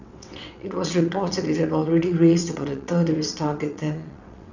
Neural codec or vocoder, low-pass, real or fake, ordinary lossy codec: vocoder, 22.05 kHz, 80 mel bands, WaveNeXt; 7.2 kHz; fake; none